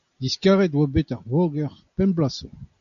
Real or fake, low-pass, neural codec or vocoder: real; 7.2 kHz; none